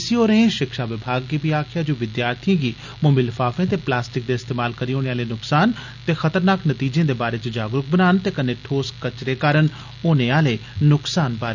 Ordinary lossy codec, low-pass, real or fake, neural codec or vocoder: none; 7.2 kHz; real; none